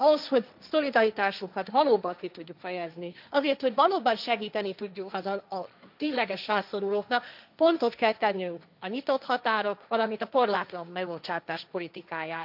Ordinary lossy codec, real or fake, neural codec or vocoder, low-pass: none; fake; codec, 16 kHz, 1.1 kbps, Voila-Tokenizer; 5.4 kHz